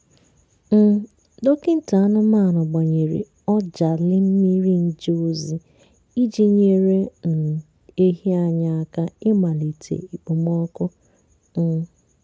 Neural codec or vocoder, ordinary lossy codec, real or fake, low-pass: none; none; real; none